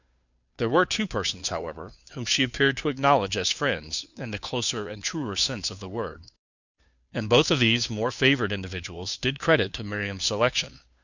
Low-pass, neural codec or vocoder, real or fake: 7.2 kHz; codec, 16 kHz, 2 kbps, FunCodec, trained on Chinese and English, 25 frames a second; fake